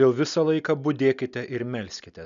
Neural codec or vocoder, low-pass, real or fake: none; 7.2 kHz; real